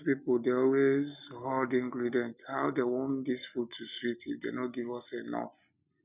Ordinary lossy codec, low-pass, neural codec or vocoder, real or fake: none; 3.6 kHz; none; real